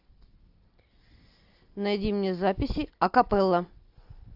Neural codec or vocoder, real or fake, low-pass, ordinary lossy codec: none; real; 5.4 kHz; AAC, 48 kbps